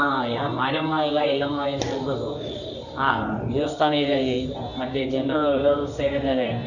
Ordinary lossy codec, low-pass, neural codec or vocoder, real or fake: none; 7.2 kHz; codec, 24 kHz, 0.9 kbps, WavTokenizer, medium music audio release; fake